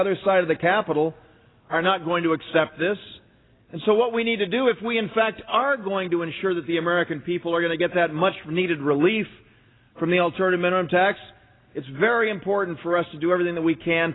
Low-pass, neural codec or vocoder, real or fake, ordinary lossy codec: 7.2 kHz; none; real; AAC, 16 kbps